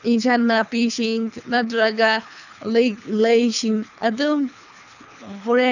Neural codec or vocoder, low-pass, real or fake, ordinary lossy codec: codec, 24 kHz, 3 kbps, HILCodec; 7.2 kHz; fake; none